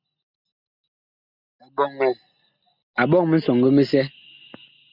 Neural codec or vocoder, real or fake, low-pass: none; real; 5.4 kHz